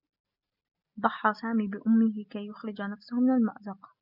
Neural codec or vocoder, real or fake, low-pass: none; real; 5.4 kHz